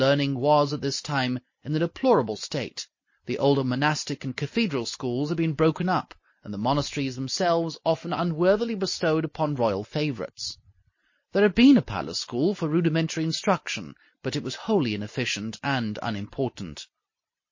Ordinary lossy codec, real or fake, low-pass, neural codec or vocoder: MP3, 32 kbps; real; 7.2 kHz; none